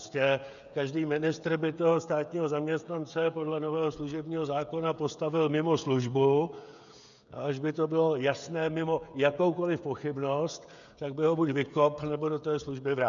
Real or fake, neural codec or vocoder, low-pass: fake; codec, 16 kHz, 16 kbps, FreqCodec, smaller model; 7.2 kHz